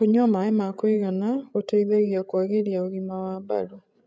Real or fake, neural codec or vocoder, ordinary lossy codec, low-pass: fake; codec, 16 kHz, 16 kbps, FreqCodec, larger model; none; none